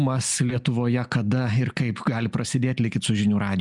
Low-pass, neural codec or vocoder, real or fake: 10.8 kHz; none; real